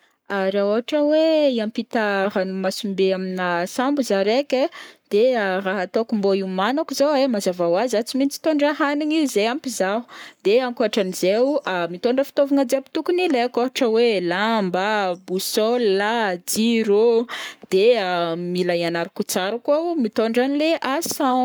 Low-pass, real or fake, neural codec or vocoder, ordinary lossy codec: none; fake; codec, 44.1 kHz, 7.8 kbps, Pupu-Codec; none